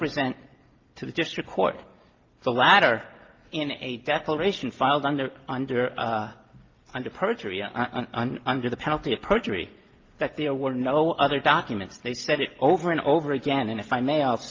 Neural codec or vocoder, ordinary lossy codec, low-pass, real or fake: none; Opus, 32 kbps; 7.2 kHz; real